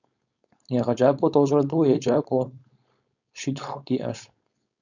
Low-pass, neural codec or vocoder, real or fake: 7.2 kHz; codec, 16 kHz, 4.8 kbps, FACodec; fake